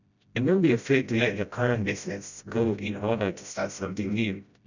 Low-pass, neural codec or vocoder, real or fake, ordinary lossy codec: 7.2 kHz; codec, 16 kHz, 0.5 kbps, FreqCodec, smaller model; fake; none